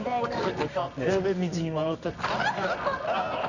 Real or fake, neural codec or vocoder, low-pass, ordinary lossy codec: fake; codec, 24 kHz, 0.9 kbps, WavTokenizer, medium music audio release; 7.2 kHz; none